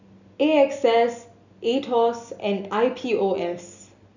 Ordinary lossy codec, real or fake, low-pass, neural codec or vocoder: none; real; 7.2 kHz; none